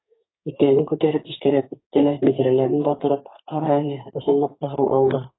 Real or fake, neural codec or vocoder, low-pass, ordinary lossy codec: fake; codec, 32 kHz, 1.9 kbps, SNAC; 7.2 kHz; AAC, 16 kbps